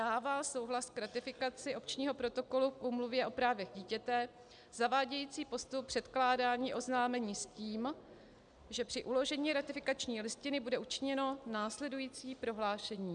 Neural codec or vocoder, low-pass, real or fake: none; 9.9 kHz; real